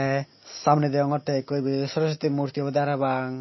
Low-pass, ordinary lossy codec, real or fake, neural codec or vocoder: 7.2 kHz; MP3, 24 kbps; real; none